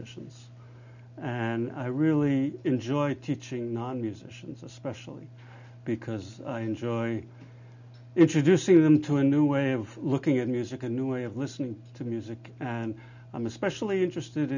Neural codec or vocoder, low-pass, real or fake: none; 7.2 kHz; real